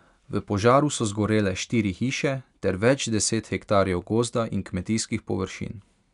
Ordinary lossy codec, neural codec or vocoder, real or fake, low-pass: none; none; real; 10.8 kHz